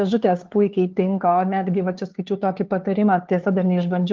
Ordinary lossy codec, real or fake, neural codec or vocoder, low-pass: Opus, 16 kbps; fake; codec, 16 kHz, 2 kbps, FunCodec, trained on LibriTTS, 25 frames a second; 7.2 kHz